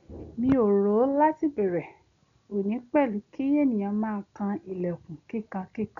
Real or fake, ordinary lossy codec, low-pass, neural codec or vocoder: real; none; 7.2 kHz; none